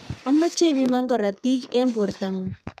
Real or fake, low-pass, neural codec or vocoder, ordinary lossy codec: fake; 14.4 kHz; codec, 32 kHz, 1.9 kbps, SNAC; none